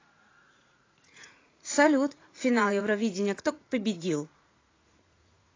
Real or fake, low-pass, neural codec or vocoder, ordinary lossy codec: fake; 7.2 kHz; vocoder, 44.1 kHz, 80 mel bands, Vocos; AAC, 32 kbps